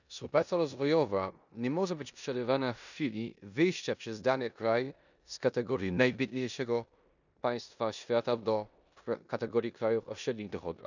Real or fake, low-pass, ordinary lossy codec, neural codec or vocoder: fake; 7.2 kHz; none; codec, 16 kHz in and 24 kHz out, 0.9 kbps, LongCat-Audio-Codec, four codebook decoder